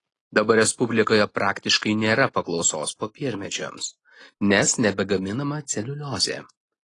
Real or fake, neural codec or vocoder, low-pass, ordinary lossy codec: real; none; 10.8 kHz; AAC, 32 kbps